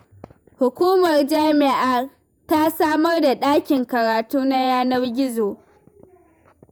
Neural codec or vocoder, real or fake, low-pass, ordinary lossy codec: vocoder, 48 kHz, 128 mel bands, Vocos; fake; none; none